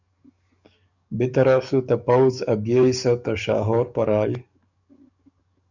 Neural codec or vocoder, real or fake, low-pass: codec, 44.1 kHz, 7.8 kbps, DAC; fake; 7.2 kHz